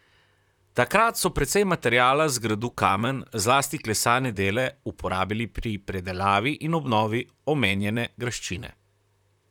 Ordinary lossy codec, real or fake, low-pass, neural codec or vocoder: none; fake; 19.8 kHz; vocoder, 44.1 kHz, 128 mel bands, Pupu-Vocoder